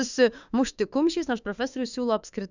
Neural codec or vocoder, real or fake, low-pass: autoencoder, 48 kHz, 32 numbers a frame, DAC-VAE, trained on Japanese speech; fake; 7.2 kHz